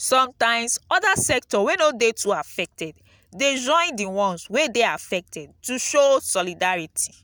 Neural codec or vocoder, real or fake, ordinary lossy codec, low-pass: none; real; none; none